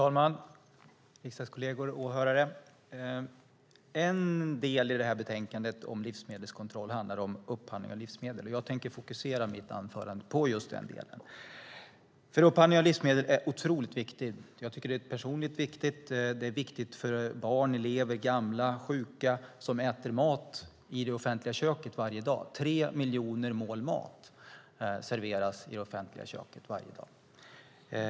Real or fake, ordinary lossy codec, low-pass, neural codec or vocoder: real; none; none; none